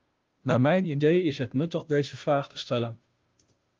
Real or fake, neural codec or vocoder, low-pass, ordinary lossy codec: fake; codec, 16 kHz, 0.5 kbps, FunCodec, trained on Chinese and English, 25 frames a second; 7.2 kHz; Opus, 24 kbps